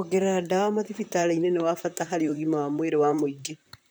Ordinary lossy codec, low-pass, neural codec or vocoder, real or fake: none; none; vocoder, 44.1 kHz, 128 mel bands, Pupu-Vocoder; fake